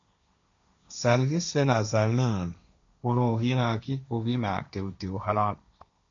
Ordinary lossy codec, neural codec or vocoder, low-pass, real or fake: MP3, 64 kbps; codec, 16 kHz, 1.1 kbps, Voila-Tokenizer; 7.2 kHz; fake